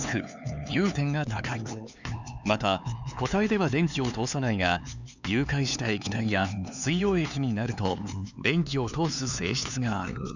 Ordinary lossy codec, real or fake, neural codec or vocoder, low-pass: none; fake; codec, 16 kHz, 4 kbps, X-Codec, HuBERT features, trained on LibriSpeech; 7.2 kHz